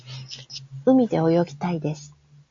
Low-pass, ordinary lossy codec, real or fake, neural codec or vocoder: 7.2 kHz; AAC, 48 kbps; real; none